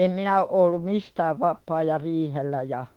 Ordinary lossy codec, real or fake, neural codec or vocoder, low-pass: Opus, 16 kbps; fake; autoencoder, 48 kHz, 32 numbers a frame, DAC-VAE, trained on Japanese speech; 19.8 kHz